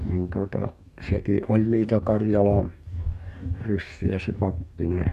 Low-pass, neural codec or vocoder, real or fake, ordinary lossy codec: 14.4 kHz; codec, 44.1 kHz, 2.6 kbps, DAC; fake; none